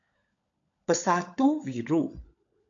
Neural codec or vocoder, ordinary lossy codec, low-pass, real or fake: codec, 16 kHz, 16 kbps, FunCodec, trained on LibriTTS, 50 frames a second; AAC, 48 kbps; 7.2 kHz; fake